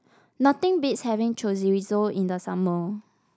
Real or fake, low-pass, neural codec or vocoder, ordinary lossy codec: real; none; none; none